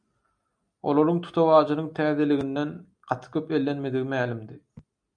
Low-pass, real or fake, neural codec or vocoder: 9.9 kHz; real; none